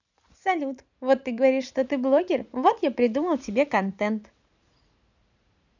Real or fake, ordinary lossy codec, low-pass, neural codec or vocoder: fake; none; 7.2 kHz; vocoder, 44.1 kHz, 80 mel bands, Vocos